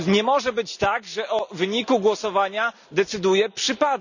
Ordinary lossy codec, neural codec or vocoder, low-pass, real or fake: none; none; 7.2 kHz; real